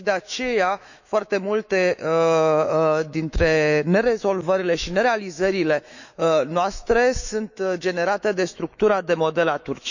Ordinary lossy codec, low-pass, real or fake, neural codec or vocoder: none; 7.2 kHz; fake; autoencoder, 48 kHz, 128 numbers a frame, DAC-VAE, trained on Japanese speech